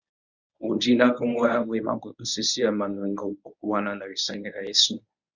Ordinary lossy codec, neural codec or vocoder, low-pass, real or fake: Opus, 64 kbps; codec, 24 kHz, 0.9 kbps, WavTokenizer, medium speech release version 1; 7.2 kHz; fake